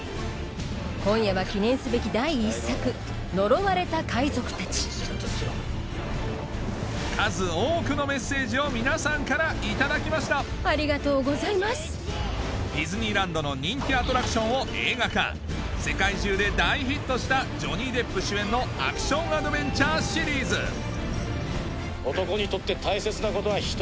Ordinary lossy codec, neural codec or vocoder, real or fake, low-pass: none; none; real; none